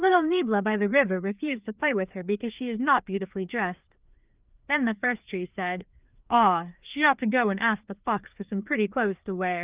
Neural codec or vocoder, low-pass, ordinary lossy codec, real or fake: codec, 16 kHz, 2 kbps, FreqCodec, larger model; 3.6 kHz; Opus, 24 kbps; fake